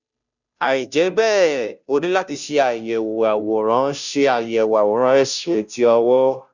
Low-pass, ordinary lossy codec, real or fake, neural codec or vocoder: 7.2 kHz; none; fake; codec, 16 kHz, 0.5 kbps, FunCodec, trained on Chinese and English, 25 frames a second